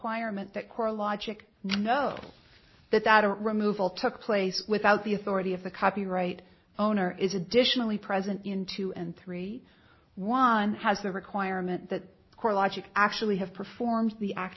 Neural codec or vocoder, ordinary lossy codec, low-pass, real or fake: none; MP3, 24 kbps; 7.2 kHz; real